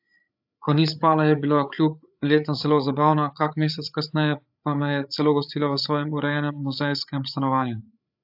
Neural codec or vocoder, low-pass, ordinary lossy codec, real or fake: codec, 16 kHz, 8 kbps, FreqCodec, larger model; 5.4 kHz; AAC, 48 kbps; fake